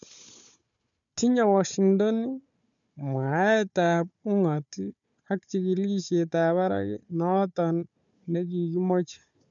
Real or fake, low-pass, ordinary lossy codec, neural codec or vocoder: fake; 7.2 kHz; none; codec, 16 kHz, 4 kbps, FunCodec, trained on Chinese and English, 50 frames a second